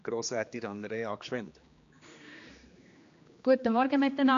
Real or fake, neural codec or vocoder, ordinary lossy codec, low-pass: fake; codec, 16 kHz, 2 kbps, X-Codec, HuBERT features, trained on general audio; AAC, 48 kbps; 7.2 kHz